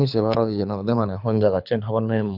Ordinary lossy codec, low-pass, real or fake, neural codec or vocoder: none; 5.4 kHz; fake; codec, 24 kHz, 6 kbps, HILCodec